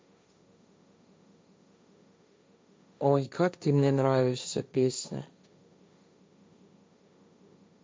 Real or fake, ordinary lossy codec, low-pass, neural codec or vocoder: fake; none; none; codec, 16 kHz, 1.1 kbps, Voila-Tokenizer